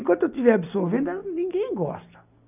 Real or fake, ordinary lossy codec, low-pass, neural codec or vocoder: real; none; 3.6 kHz; none